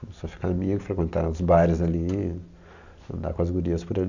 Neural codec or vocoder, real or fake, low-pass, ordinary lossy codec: none; real; 7.2 kHz; none